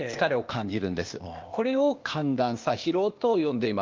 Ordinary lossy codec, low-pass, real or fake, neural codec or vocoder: Opus, 24 kbps; 7.2 kHz; fake; codec, 16 kHz, 0.8 kbps, ZipCodec